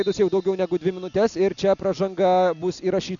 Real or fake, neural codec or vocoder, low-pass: real; none; 7.2 kHz